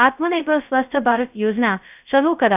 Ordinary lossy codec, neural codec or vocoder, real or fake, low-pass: none; codec, 16 kHz, 0.2 kbps, FocalCodec; fake; 3.6 kHz